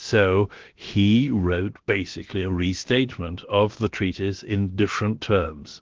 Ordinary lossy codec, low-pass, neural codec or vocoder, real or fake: Opus, 16 kbps; 7.2 kHz; codec, 16 kHz, about 1 kbps, DyCAST, with the encoder's durations; fake